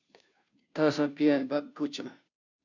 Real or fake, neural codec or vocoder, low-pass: fake; codec, 16 kHz, 0.5 kbps, FunCodec, trained on Chinese and English, 25 frames a second; 7.2 kHz